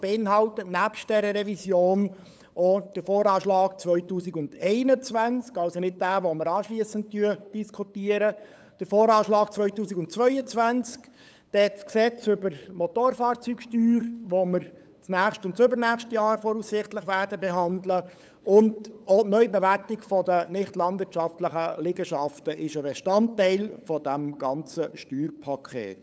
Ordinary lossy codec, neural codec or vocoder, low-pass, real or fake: none; codec, 16 kHz, 8 kbps, FunCodec, trained on LibriTTS, 25 frames a second; none; fake